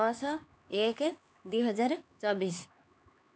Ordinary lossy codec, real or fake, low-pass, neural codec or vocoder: none; fake; none; codec, 16 kHz, 4 kbps, X-Codec, HuBERT features, trained on LibriSpeech